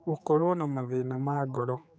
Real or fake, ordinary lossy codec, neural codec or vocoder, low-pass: fake; none; codec, 16 kHz, 4 kbps, X-Codec, HuBERT features, trained on general audio; none